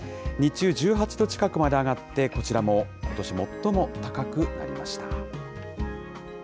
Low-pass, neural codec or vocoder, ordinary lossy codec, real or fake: none; none; none; real